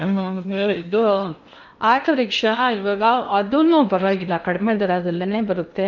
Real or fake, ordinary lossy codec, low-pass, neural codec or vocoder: fake; none; 7.2 kHz; codec, 16 kHz in and 24 kHz out, 0.8 kbps, FocalCodec, streaming, 65536 codes